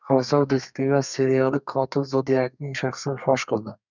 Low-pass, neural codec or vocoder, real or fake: 7.2 kHz; codec, 32 kHz, 1.9 kbps, SNAC; fake